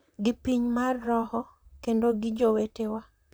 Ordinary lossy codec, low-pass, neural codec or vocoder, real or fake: none; none; vocoder, 44.1 kHz, 128 mel bands, Pupu-Vocoder; fake